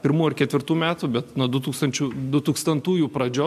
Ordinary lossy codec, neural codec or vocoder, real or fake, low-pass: MP3, 64 kbps; none; real; 14.4 kHz